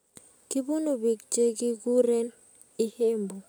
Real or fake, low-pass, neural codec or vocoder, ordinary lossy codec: real; none; none; none